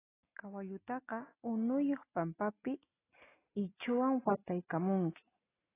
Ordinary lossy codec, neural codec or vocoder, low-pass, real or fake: AAC, 16 kbps; none; 3.6 kHz; real